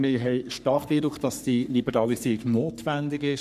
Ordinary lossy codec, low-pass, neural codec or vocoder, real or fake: none; 14.4 kHz; codec, 44.1 kHz, 3.4 kbps, Pupu-Codec; fake